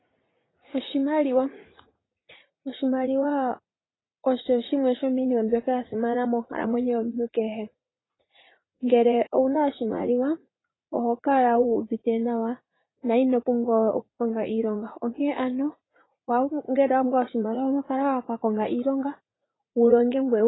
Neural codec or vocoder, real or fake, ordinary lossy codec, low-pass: vocoder, 44.1 kHz, 80 mel bands, Vocos; fake; AAC, 16 kbps; 7.2 kHz